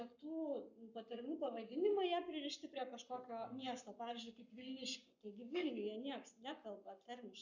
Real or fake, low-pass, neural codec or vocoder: fake; 7.2 kHz; codec, 44.1 kHz, 7.8 kbps, Pupu-Codec